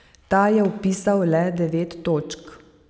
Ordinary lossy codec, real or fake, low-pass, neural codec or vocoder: none; real; none; none